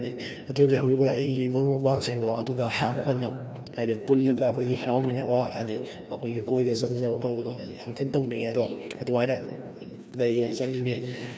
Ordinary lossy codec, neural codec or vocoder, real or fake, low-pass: none; codec, 16 kHz, 1 kbps, FreqCodec, larger model; fake; none